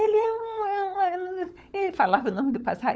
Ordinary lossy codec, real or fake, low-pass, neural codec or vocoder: none; fake; none; codec, 16 kHz, 16 kbps, FunCodec, trained on LibriTTS, 50 frames a second